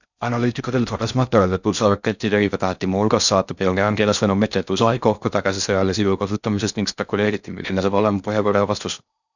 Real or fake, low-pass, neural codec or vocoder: fake; 7.2 kHz; codec, 16 kHz in and 24 kHz out, 0.6 kbps, FocalCodec, streaming, 4096 codes